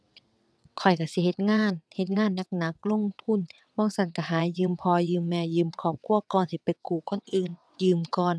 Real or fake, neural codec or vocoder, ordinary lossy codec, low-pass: fake; vocoder, 22.05 kHz, 80 mel bands, WaveNeXt; none; none